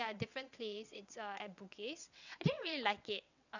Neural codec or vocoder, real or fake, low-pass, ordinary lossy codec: vocoder, 22.05 kHz, 80 mel bands, WaveNeXt; fake; 7.2 kHz; none